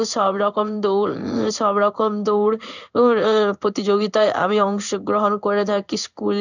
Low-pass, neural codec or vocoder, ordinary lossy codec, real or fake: 7.2 kHz; codec, 16 kHz in and 24 kHz out, 1 kbps, XY-Tokenizer; none; fake